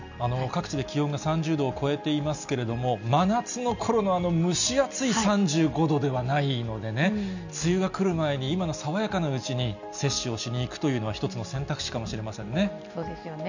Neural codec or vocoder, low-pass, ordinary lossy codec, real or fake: none; 7.2 kHz; none; real